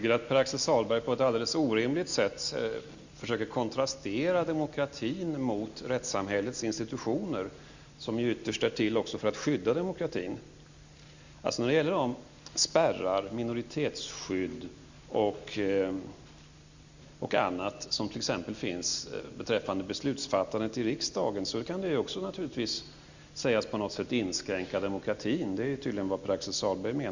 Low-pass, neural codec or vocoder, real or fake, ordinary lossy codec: 7.2 kHz; none; real; Opus, 64 kbps